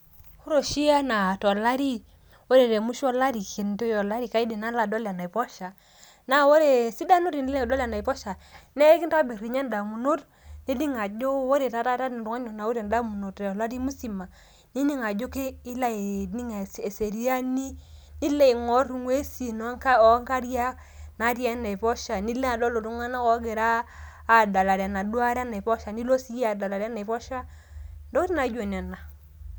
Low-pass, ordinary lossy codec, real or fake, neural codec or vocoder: none; none; real; none